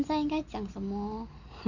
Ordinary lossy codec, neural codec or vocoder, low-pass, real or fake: none; none; 7.2 kHz; real